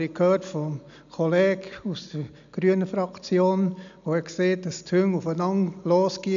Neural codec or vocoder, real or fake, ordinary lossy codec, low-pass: none; real; MP3, 96 kbps; 7.2 kHz